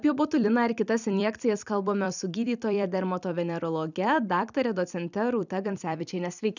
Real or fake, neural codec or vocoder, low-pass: fake; vocoder, 44.1 kHz, 128 mel bands every 256 samples, BigVGAN v2; 7.2 kHz